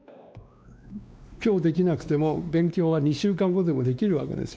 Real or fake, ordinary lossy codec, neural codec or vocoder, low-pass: fake; none; codec, 16 kHz, 2 kbps, X-Codec, WavLM features, trained on Multilingual LibriSpeech; none